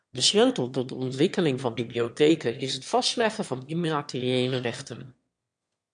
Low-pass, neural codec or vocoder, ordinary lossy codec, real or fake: 9.9 kHz; autoencoder, 22.05 kHz, a latent of 192 numbers a frame, VITS, trained on one speaker; MP3, 64 kbps; fake